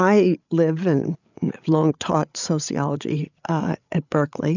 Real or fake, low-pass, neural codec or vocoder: fake; 7.2 kHz; codec, 16 kHz, 8 kbps, FreqCodec, larger model